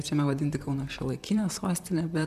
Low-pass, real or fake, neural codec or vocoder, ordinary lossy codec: 14.4 kHz; fake; vocoder, 44.1 kHz, 128 mel bands, Pupu-Vocoder; MP3, 96 kbps